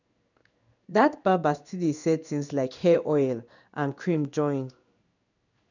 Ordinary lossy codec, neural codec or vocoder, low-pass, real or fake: none; codec, 16 kHz in and 24 kHz out, 1 kbps, XY-Tokenizer; 7.2 kHz; fake